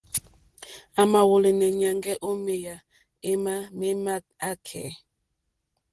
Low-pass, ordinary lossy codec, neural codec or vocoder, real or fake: 10.8 kHz; Opus, 16 kbps; none; real